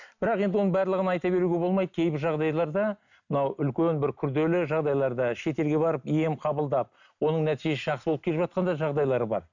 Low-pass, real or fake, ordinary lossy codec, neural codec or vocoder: 7.2 kHz; real; none; none